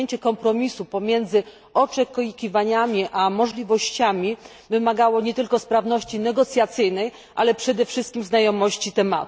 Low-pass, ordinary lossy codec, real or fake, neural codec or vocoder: none; none; real; none